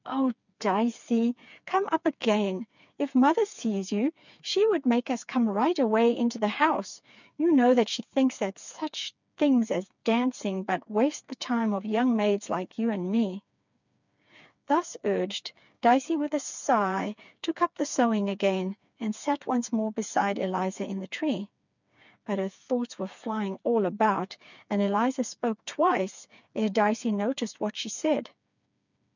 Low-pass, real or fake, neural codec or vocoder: 7.2 kHz; fake; codec, 16 kHz, 4 kbps, FreqCodec, smaller model